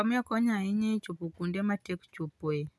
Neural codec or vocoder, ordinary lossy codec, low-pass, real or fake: none; none; none; real